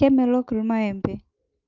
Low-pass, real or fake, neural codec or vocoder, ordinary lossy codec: 7.2 kHz; real; none; Opus, 24 kbps